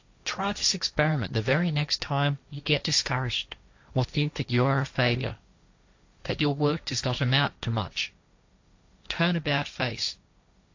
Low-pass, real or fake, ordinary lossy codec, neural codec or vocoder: 7.2 kHz; fake; AAC, 48 kbps; codec, 16 kHz, 1.1 kbps, Voila-Tokenizer